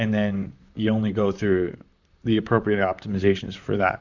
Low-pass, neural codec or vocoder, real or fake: 7.2 kHz; vocoder, 44.1 kHz, 128 mel bands, Pupu-Vocoder; fake